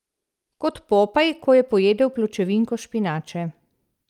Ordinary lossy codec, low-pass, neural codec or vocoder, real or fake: Opus, 32 kbps; 19.8 kHz; none; real